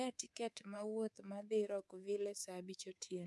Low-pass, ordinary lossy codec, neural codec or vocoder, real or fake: none; none; codec, 24 kHz, 3.1 kbps, DualCodec; fake